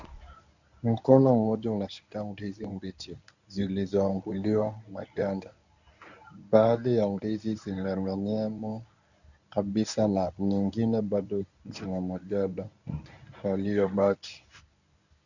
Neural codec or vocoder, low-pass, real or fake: codec, 24 kHz, 0.9 kbps, WavTokenizer, medium speech release version 1; 7.2 kHz; fake